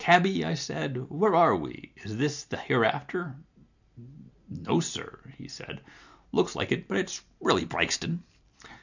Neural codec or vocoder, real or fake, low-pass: none; real; 7.2 kHz